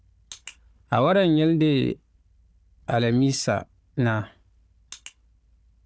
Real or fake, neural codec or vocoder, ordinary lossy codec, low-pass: fake; codec, 16 kHz, 4 kbps, FunCodec, trained on Chinese and English, 50 frames a second; none; none